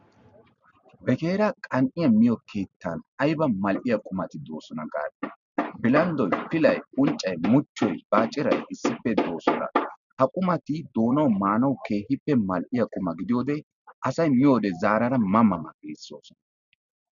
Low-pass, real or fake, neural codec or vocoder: 7.2 kHz; real; none